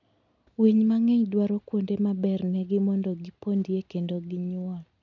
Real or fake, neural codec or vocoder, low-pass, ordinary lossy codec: real; none; 7.2 kHz; none